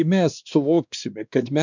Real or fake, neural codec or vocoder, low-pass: fake; codec, 16 kHz, 2 kbps, X-Codec, WavLM features, trained on Multilingual LibriSpeech; 7.2 kHz